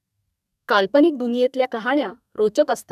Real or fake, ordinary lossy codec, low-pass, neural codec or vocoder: fake; none; 14.4 kHz; codec, 32 kHz, 1.9 kbps, SNAC